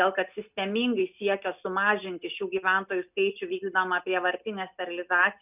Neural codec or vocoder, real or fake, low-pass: none; real; 3.6 kHz